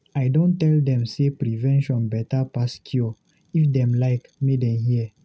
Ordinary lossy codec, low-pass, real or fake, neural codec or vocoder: none; none; real; none